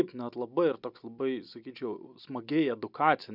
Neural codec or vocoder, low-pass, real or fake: none; 5.4 kHz; real